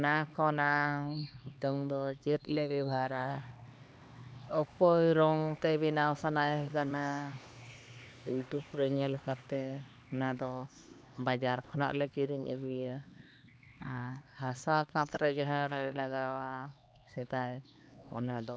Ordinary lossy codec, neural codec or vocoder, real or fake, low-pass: none; codec, 16 kHz, 2 kbps, X-Codec, HuBERT features, trained on LibriSpeech; fake; none